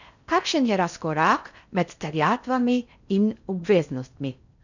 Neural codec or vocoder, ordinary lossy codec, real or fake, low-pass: codec, 16 kHz in and 24 kHz out, 0.6 kbps, FocalCodec, streaming, 4096 codes; none; fake; 7.2 kHz